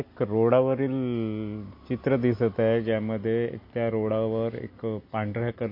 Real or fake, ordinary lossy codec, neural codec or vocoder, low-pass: real; MP3, 32 kbps; none; 5.4 kHz